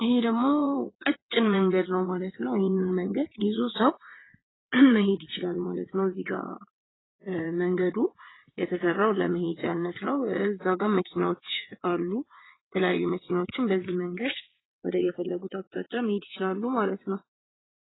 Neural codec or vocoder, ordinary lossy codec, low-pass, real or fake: vocoder, 22.05 kHz, 80 mel bands, WaveNeXt; AAC, 16 kbps; 7.2 kHz; fake